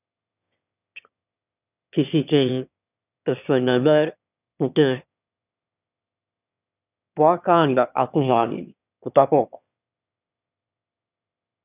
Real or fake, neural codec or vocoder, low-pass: fake; autoencoder, 22.05 kHz, a latent of 192 numbers a frame, VITS, trained on one speaker; 3.6 kHz